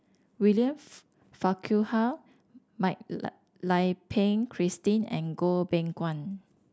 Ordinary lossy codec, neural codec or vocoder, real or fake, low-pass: none; none; real; none